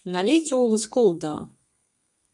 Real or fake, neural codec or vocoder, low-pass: fake; codec, 32 kHz, 1.9 kbps, SNAC; 10.8 kHz